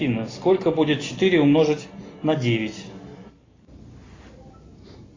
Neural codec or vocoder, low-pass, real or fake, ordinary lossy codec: none; 7.2 kHz; real; AAC, 32 kbps